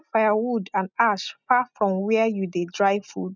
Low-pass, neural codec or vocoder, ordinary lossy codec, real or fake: 7.2 kHz; none; none; real